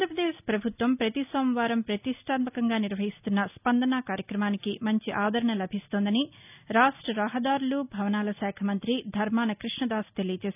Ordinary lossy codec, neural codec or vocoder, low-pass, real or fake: none; none; 3.6 kHz; real